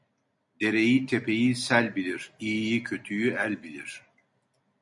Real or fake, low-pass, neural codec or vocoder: real; 10.8 kHz; none